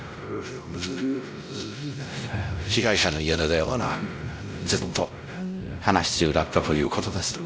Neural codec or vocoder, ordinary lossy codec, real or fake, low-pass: codec, 16 kHz, 0.5 kbps, X-Codec, WavLM features, trained on Multilingual LibriSpeech; none; fake; none